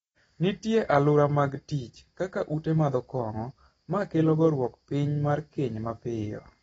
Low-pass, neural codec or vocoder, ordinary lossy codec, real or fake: 19.8 kHz; none; AAC, 24 kbps; real